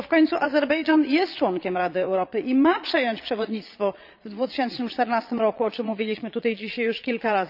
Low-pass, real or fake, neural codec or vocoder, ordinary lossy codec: 5.4 kHz; fake; vocoder, 22.05 kHz, 80 mel bands, Vocos; none